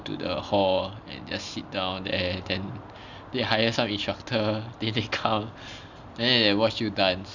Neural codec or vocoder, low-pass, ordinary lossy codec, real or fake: none; 7.2 kHz; none; real